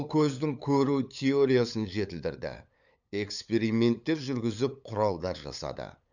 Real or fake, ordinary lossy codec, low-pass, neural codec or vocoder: fake; none; 7.2 kHz; codec, 16 kHz, 8 kbps, FunCodec, trained on LibriTTS, 25 frames a second